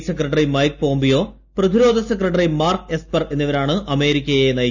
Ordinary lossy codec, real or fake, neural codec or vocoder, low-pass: none; real; none; 7.2 kHz